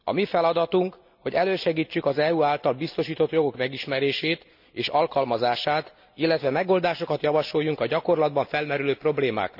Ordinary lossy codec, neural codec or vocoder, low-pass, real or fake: none; none; 5.4 kHz; real